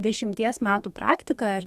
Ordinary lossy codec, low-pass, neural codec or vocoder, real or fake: Opus, 64 kbps; 14.4 kHz; codec, 32 kHz, 1.9 kbps, SNAC; fake